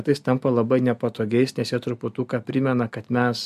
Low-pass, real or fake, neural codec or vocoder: 14.4 kHz; real; none